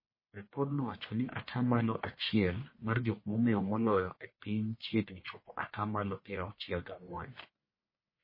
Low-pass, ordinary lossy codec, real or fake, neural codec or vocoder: 5.4 kHz; MP3, 24 kbps; fake; codec, 44.1 kHz, 1.7 kbps, Pupu-Codec